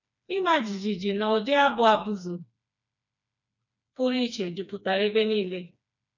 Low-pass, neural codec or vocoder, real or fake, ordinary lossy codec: 7.2 kHz; codec, 16 kHz, 2 kbps, FreqCodec, smaller model; fake; none